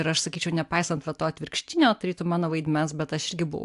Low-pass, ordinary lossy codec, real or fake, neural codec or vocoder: 10.8 kHz; AAC, 64 kbps; real; none